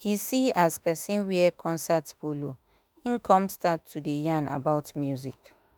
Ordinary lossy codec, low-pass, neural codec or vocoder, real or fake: none; none; autoencoder, 48 kHz, 32 numbers a frame, DAC-VAE, trained on Japanese speech; fake